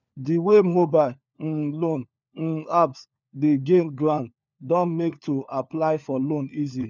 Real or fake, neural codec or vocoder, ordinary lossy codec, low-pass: fake; codec, 16 kHz, 4 kbps, FunCodec, trained on LibriTTS, 50 frames a second; none; 7.2 kHz